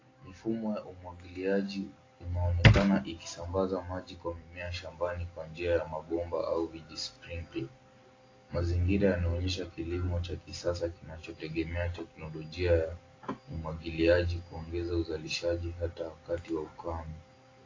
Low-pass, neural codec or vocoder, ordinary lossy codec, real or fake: 7.2 kHz; none; AAC, 32 kbps; real